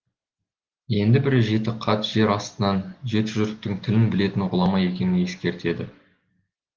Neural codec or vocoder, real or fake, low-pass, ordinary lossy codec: none; real; 7.2 kHz; Opus, 16 kbps